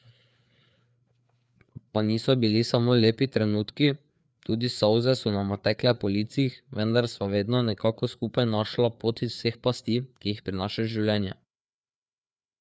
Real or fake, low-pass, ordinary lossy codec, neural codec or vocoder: fake; none; none; codec, 16 kHz, 4 kbps, FreqCodec, larger model